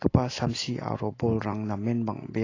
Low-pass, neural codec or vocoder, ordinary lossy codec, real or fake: 7.2 kHz; none; AAC, 32 kbps; real